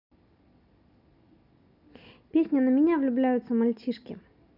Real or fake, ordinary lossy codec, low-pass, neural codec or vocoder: real; none; 5.4 kHz; none